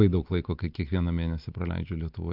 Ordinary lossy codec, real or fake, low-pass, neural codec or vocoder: Opus, 32 kbps; real; 5.4 kHz; none